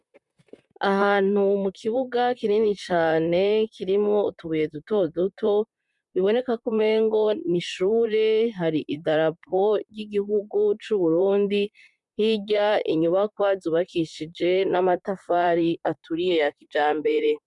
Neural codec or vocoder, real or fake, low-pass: vocoder, 44.1 kHz, 128 mel bands, Pupu-Vocoder; fake; 10.8 kHz